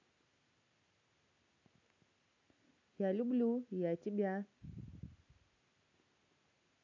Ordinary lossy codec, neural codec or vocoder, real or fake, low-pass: none; none; real; 7.2 kHz